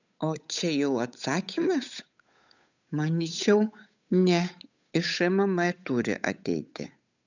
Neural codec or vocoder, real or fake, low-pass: codec, 16 kHz, 8 kbps, FunCodec, trained on Chinese and English, 25 frames a second; fake; 7.2 kHz